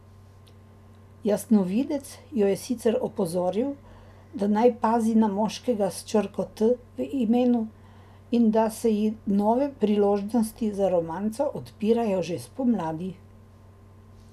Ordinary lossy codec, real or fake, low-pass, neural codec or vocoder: none; real; 14.4 kHz; none